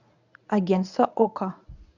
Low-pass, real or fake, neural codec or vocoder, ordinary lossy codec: 7.2 kHz; fake; codec, 24 kHz, 0.9 kbps, WavTokenizer, medium speech release version 1; none